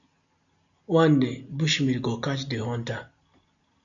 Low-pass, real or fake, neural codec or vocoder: 7.2 kHz; real; none